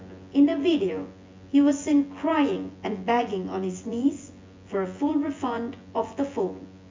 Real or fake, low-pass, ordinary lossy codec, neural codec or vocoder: fake; 7.2 kHz; MP3, 48 kbps; vocoder, 24 kHz, 100 mel bands, Vocos